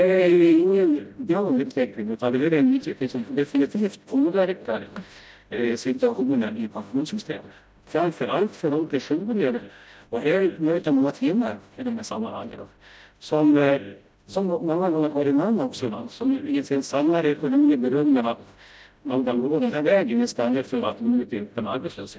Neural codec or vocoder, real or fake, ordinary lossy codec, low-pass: codec, 16 kHz, 0.5 kbps, FreqCodec, smaller model; fake; none; none